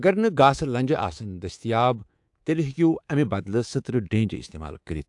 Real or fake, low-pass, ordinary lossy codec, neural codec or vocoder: fake; 10.8 kHz; AAC, 64 kbps; codec, 24 kHz, 3.1 kbps, DualCodec